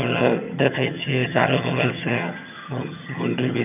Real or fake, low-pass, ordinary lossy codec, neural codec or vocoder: fake; 3.6 kHz; none; vocoder, 22.05 kHz, 80 mel bands, HiFi-GAN